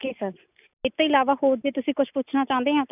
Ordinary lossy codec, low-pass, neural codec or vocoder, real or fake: none; 3.6 kHz; none; real